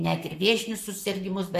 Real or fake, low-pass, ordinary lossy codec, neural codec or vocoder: fake; 14.4 kHz; MP3, 64 kbps; codec, 44.1 kHz, 7.8 kbps, Pupu-Codec